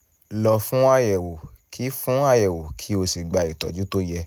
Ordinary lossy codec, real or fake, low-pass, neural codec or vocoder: none; real; none; none